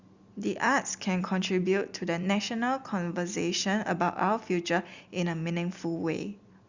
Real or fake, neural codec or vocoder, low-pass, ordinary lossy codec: real; none; 7.2 kHz; Opus, 64 kbps